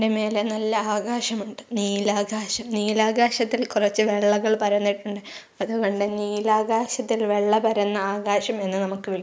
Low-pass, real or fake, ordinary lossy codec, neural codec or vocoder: none; real; none; none